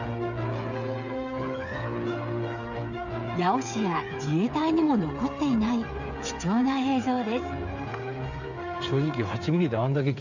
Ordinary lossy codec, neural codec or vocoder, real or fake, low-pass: none; codec, 16 kHz, 8 kbps, FreqCodec, smaller model; fake; 7.2 kHz